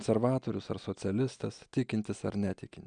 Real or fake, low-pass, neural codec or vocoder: real; 9.9 kHz; none